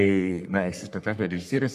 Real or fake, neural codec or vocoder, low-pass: fake; codec, 44.1 kHz, 3.4 kbps, Pupu-Codec; 14.4 kHz